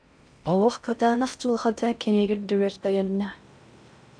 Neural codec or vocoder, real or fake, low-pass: codec, 16 kHz in and 24 kHz out, 0.6 kbps, FocalCodec, streaming, 4096 codes; fake; 9.9 kHz